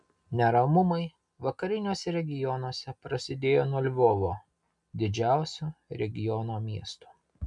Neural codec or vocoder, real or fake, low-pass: none; real; 10.8 kHz